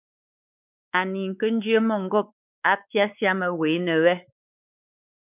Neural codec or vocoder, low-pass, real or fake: codec, 16 kHz, 4 kbps, X-Codec, WavLM features, trained on Multilingual LibriSpeech; 3.6 kHz; fake